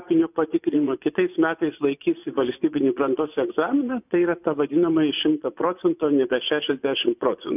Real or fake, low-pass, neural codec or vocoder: fake; 3.6 kHz; vocoder, 24 kHz, 100 mel bands, Vocos